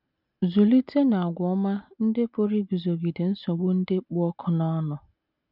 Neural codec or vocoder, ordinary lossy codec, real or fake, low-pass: none; none; real; 5.4 kHz